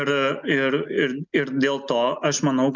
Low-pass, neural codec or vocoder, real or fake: 7.2 kHz; none; real